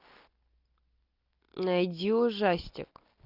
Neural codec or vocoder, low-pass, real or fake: none; 5.4 kHz; real